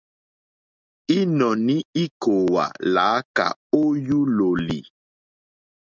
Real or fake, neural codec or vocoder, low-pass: real; none; 7.2 kHz